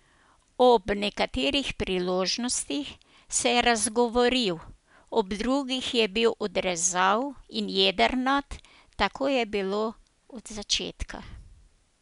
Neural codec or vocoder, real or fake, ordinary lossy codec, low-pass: none; real; MP3, 96 kbps; 10.8 kHz